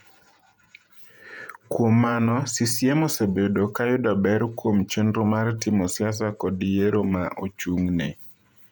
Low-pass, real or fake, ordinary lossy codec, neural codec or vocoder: 19.8 kHz; fake; none; vocoder, 48 kHz, 128 mel bands, Vocos